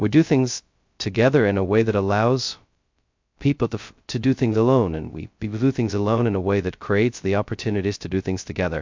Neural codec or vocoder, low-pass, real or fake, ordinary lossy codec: codec, 16 kHz, 0.2 kbps, FocalCodec; 7.2 kHz; fake; MP3, 64 kbps